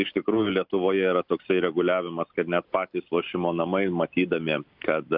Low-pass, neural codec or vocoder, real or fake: 5.4 kHz; none; real